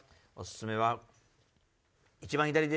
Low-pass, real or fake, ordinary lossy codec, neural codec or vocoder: none; real; none; none